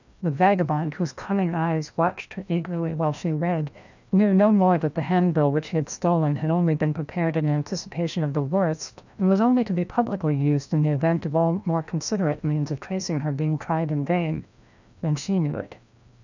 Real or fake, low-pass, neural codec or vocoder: fake; 7.2 kHz; codec, 16 kHz, 1 kbps, FreqCodec, larger model